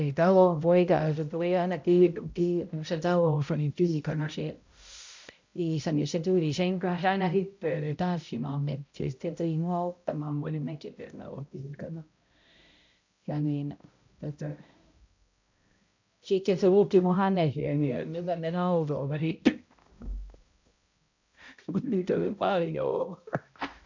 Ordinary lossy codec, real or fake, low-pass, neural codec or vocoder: MP3, 64 kbps; fake; 7.2 kHz; codec, 16 kHz, 0.5 kbps, X-Codec, HuBERT features, trained on balanced general audio